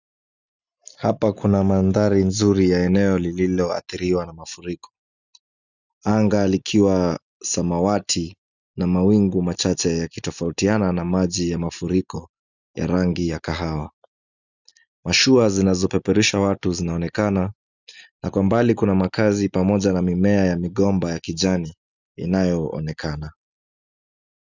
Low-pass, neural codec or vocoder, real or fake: 7.2 kHz; none; real